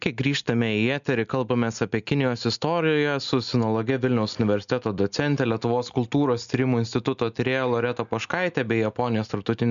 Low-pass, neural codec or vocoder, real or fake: 7.2 kHz; none; real